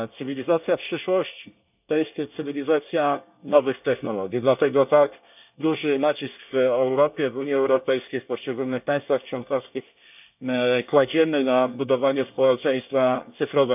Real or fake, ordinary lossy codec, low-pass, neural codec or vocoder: fake; none; 3.6 kHz; codec, 24 kHz, 1 kbps, SNAC